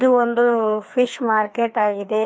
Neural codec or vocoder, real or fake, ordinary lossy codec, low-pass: codec, 16 kHz, 2 kbps, FreqCodec, larger model; fake; none; none